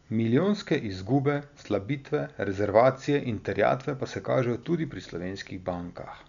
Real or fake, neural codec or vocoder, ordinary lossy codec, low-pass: real; none; MP3, 96 kbps; 7.2 kHz